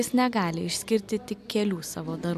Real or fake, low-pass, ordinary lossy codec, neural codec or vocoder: real; 14.4 kHz; AAC, 96 kbps; none